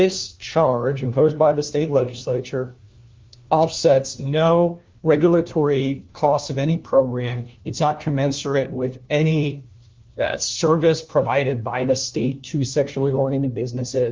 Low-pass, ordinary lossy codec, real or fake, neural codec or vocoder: 7.2 kHz; Opus, 32 kbps; fake; codec, 16 kHz, 1 kbps, FunCodec, trained on LibriTTS, 50 frames a second